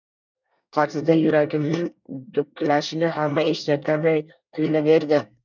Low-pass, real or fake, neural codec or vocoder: 7.2 kHz; fake; codec, 24 kHz, 1 kbps, SNAC